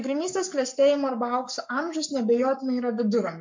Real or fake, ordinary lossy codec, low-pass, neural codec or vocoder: fake; MP3, 48 kbps; 7.2 kHz; codec, 44.1 kHz, 7.8 kbps, Pupu-Codec